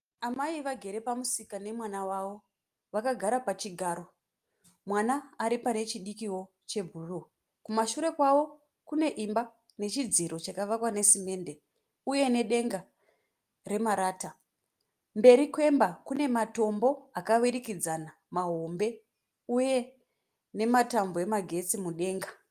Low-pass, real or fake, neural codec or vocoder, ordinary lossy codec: 14.4 kHz; real; none; Opus, 32 kbps